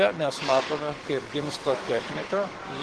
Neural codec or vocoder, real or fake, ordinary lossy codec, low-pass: codec, 44.1 kHz, 7.8 kbps, DAC; fake; Opus, 16 kbps; 10.8 kHz